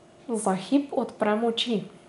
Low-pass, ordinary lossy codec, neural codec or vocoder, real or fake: 10.8 kHz; none; none; real